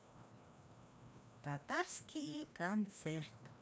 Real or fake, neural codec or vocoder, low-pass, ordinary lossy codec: fake; codec, 16 kHz, 1 kbps, FreqCodec, larger model; none; none